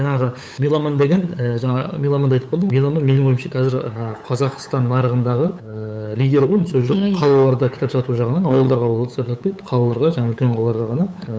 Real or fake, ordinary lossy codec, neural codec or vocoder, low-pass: fake; none; codec, 16 kHz, 8 kbps, FunCodec, trained on LibriTTS, 25 frames a second; none